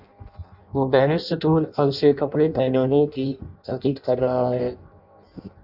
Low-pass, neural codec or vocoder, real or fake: 5.4 kHz; codec, 16 kHz in and 24 kHz out, 0.6 kbps, FireRedTTS-2 codec; fake